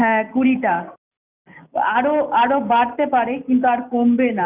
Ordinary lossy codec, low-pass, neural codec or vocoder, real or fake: none; 3.6 kHz; none; real